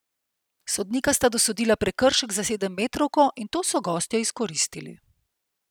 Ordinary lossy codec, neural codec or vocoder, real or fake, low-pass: none; none; real; none